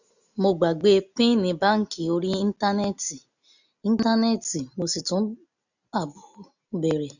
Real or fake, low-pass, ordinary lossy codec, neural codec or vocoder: real; 7.2 kHz; none; none